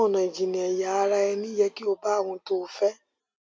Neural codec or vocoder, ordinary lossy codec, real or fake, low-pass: none; none; real; none